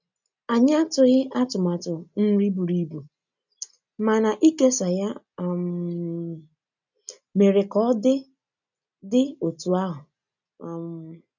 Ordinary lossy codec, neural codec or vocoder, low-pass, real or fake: none; none; 7.2 kHz; real